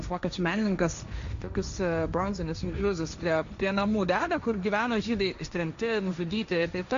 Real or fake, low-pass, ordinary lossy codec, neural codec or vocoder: fake; 7.2 kHz; Opus, 64 kbps; codec, 16 kHz, 1.1 kbps, Voila-Tokenizer